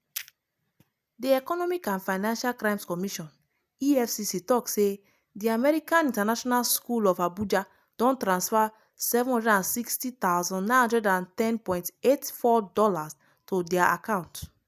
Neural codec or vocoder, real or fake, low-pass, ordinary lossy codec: none; real; 14.4 kHz; none